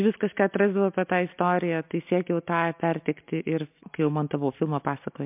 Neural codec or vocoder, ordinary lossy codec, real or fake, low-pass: none; MP3, 32 kbps; real; 3.6 kHz